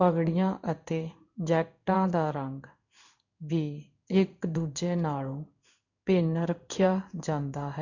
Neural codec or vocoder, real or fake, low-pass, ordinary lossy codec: codec, 16 kHz in and 24 kHz out, 1 kbps, XY-Tokenizer; fake; 7.2 kHz; none